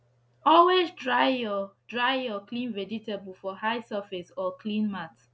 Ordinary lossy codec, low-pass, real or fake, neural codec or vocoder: none; none; real; none